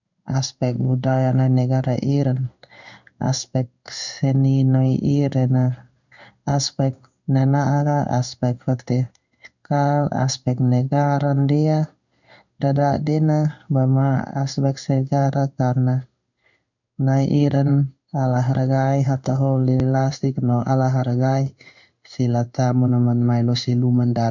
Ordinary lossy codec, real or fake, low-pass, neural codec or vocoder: none; fake; 7.2 kHz; codec, 16 kHz in and 24 kHz out, 1 kbps, XY-Tokenizer